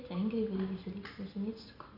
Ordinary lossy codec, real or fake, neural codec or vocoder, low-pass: none; real; none; 5.4 kHz